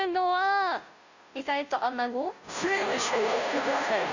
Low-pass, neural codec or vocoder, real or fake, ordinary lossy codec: 7.2 kHz; codec, 16 kHz, 0.5 kbps, FunCodec, trained on Chinese and English, 25 frames a second; fake; none